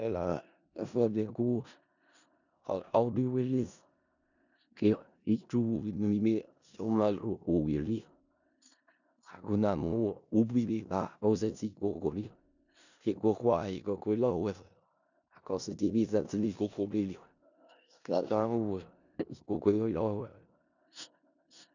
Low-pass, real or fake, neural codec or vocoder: 7.2 kHz; fake; codec, 16 kHz in and 24 kHz out, 0.4 kbps, LongCat-Audio-Codec, four codebook decoder